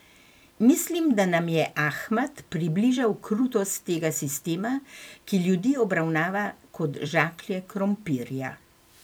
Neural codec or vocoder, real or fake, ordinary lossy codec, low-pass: vocoder, 44.1 kHz, 128 mel bands every 256 samples, BigVGAN v2; fake; none; none